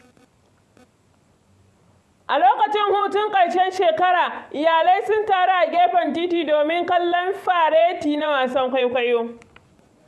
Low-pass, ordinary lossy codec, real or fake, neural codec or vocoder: none; none; fake; vocoder, 24 kHz, 100 mel bands, Vocos